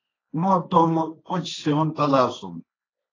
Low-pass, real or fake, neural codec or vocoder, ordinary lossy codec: 7.2 kHz; fake; codec, 16 kHz, 1.1 kbps, Voila-Tokenizer; AAC, 32 kbps